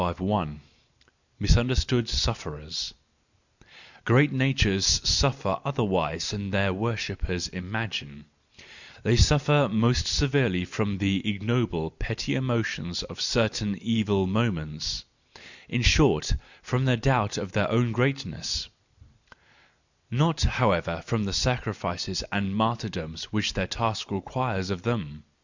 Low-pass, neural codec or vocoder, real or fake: 7.2 kHz; none; real